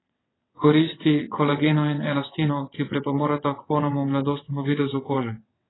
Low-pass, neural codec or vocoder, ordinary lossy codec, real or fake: 7.2 kHz; vocoder, 22.05 kHz, 80 mel bands, WaveNeXt; AAC, 16 kbps; fake